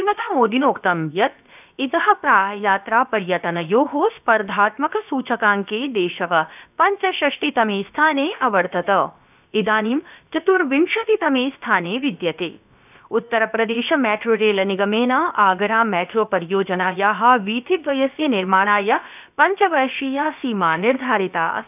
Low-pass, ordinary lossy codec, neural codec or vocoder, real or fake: 3.6 kHz; none; codec, 16 kHz, about 1 kbps, DyCAST, with the encoder's durations; fake